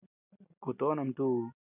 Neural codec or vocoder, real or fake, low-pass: none; real; 3.6 kHz